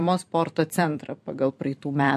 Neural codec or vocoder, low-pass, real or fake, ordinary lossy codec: vocoder, 44.1 kHz, 128 mel bands every 256 samples, BigVGAN v2; 14.4 kHz; fake; MP3, 64 kbps